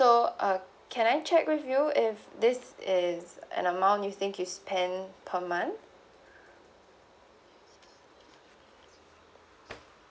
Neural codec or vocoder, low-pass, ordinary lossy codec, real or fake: none; none; none; real